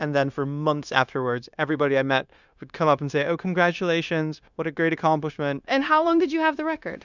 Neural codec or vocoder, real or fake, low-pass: codec, 16 kHz, 0.9 kbps, LongCat-Audio-Codec; fake; 7.2 kHz